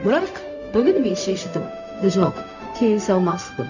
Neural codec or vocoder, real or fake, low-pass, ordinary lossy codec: codec, 16 kHz, 0.4 kbps, LongCat-Audio-Codec; fake; 7.2 kHz; none